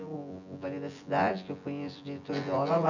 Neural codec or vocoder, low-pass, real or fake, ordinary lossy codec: vocoder, 24 kHz, 100 mel bands, Vocos; 7.2 kHz; fake; none